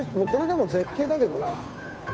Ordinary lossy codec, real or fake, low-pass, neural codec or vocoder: none; fake; none; codec, 16 kHz, 2 kbps, FunCodec, trained on Chinese and English, 25 frames a second